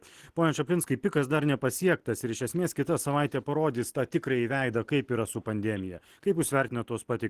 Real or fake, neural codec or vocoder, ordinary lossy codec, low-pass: real; none; Opus, 16 kbps; 14.4 kHz